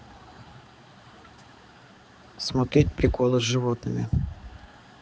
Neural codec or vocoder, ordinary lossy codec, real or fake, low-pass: codec, 16 kHz, 4 kbps, X-Codec, HuBERT features, trained on balanced general audio; none; fake; none